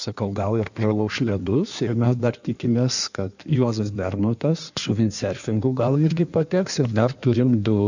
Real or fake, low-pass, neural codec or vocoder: fake; 7.2 kHz; codec, 16 kHz in and 24 kHz out, 1.1 kbps, FireRedTTS-2 codec